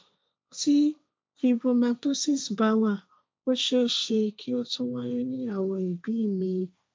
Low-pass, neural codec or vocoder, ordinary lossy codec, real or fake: none; codec, 16 kHz, 1.1 kbps, Voila-Tokenizer; none; fake